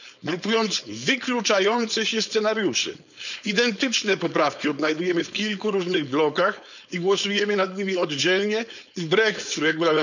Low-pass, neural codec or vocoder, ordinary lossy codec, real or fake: 7.2 kHz; codec, 16 kHz, 4.8 kbps, FACodec; none; fake